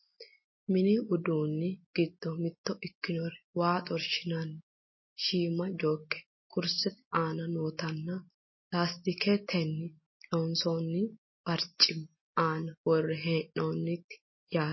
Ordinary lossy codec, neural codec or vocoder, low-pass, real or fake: MP3, 24 kbps; none; 7.2 kHz; real